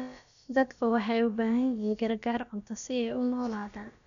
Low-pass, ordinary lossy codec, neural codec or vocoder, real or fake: 7.2 kHz; none; codec, 16 kHz, about 1 kbps, DyCAST, with the encoder's durations; fake